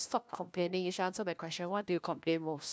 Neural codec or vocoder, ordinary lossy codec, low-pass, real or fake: codec, 16 kHz, 1 kbps, FunCodec, trained on LibriTTS, 50 frames a second; none; none; fake